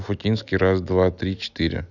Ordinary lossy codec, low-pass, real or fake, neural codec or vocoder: none; 7.2 kHz; real; none